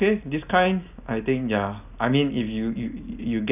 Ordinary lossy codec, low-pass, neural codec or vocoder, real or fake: none; 3.6 kHz; none; real